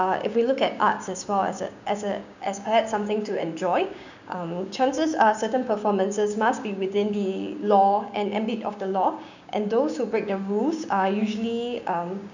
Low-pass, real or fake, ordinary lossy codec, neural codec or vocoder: 7.2 kHz; fake; none; codec, 16 kHz, 6 kbps, DAC